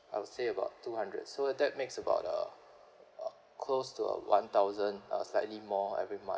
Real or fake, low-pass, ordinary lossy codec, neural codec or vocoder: real; none; none; none